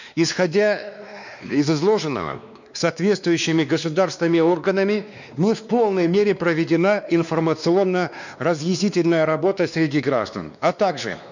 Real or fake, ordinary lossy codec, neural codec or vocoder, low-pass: fake; none; codec, 16 kHz, 2 kbps, X-Codec, WavLM features, trained on Multilingual LibriSpeech; 7.2 kHz